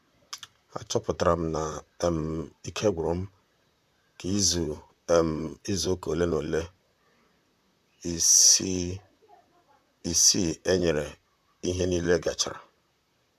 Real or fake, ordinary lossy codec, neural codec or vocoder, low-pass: fake; none; vocoder, 44.1 kHz, 128 mel bands, Pupu-Vocoder; 14.4 kHz